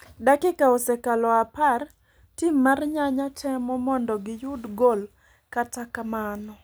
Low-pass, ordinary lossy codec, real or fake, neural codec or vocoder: none; none; real; none